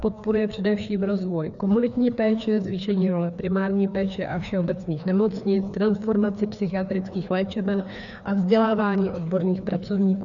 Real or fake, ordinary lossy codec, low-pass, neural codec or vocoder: fake; MP3, 96 kbps; 7.2 kHz; codec, 16 kHz, 2 kbps, FreqCodec, larger model